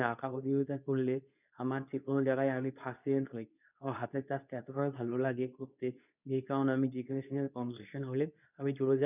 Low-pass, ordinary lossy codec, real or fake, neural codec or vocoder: 3.6 kHz; none; fake; codec, 24 kHz, 0.9 kbps, WavTokenizer, medium speech release version 2